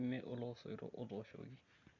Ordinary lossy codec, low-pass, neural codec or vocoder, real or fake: MP3, 64 kbps; 7.2 kHz; none; real